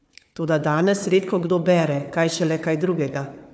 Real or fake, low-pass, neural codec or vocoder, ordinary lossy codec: fake; none; codec, 16 kHz, 4 kbps, FunCodec, trained on Chinese and English, 50 frames a second; none